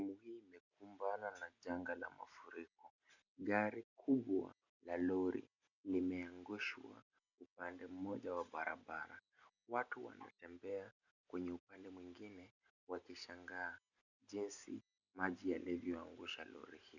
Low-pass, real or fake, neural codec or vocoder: 7.2 kHz; real; none